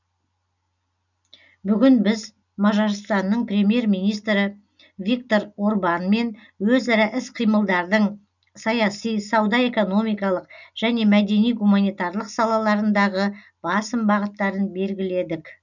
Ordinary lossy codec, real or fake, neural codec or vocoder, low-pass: none; real; none; 7.2 kHz